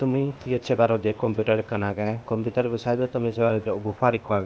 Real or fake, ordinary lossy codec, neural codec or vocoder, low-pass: fake; none; codec, 16 kHz, 0.8 kbps, ZipCodec; none